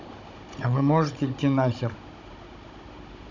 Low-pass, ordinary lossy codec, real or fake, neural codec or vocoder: 7.2 kHz; none; fake; codec, 16 kHz, 16 kbps, FunCodec, trained on Chinese and English, 50 frames a second